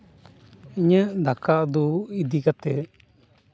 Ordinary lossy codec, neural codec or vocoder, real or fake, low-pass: none; none; real; none